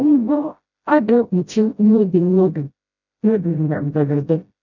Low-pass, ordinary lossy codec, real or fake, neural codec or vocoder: 7.2 kHz; none; fake; codec, 16 kHz, 0.5 kbps, FreqCodec, smaller model